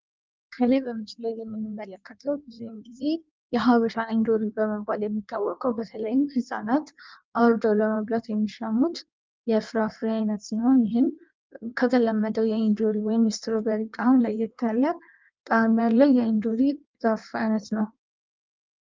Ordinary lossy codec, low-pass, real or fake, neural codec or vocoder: Opus, 24 kbps; 7.2 kHz; fake; codec, 16 kHz in and 24 kHz out, 1.1 kbps, FireRedTTS-2 codec